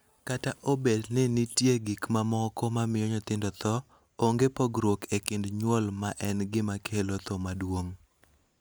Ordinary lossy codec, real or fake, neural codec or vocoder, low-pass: none; real; none; none